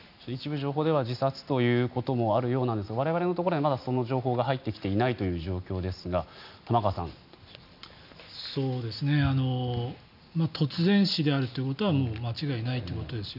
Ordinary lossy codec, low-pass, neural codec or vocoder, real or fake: none; 5.4 kHz; none; real